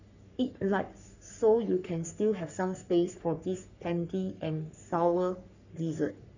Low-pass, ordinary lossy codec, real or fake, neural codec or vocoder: 7.2 kHz; none; fake; codec, 44.1 kHz, 3.4 kbps, Pupu-Codec